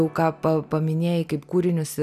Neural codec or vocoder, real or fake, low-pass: none; real; 14.4 kHz